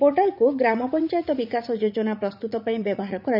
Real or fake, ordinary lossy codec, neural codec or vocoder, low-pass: fake; none; vocoder, 44.1 kHz, 80 mel bands, Vocos; 5.4 kHz